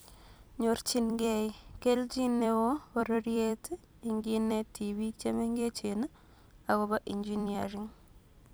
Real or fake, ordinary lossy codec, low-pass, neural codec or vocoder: fake; none; none; vocoder, 44.1 kHz, 128 mel bands every 256 samples, BigVGAN v2